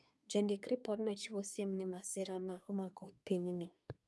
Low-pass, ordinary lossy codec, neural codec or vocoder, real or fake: none; none; codec, 24 kHz, 1 kbps, SNAC; fake